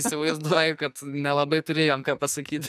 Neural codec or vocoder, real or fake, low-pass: codec, 44.1 kHz, 2.6 kbps, SNAC; fake; 14.4 kHz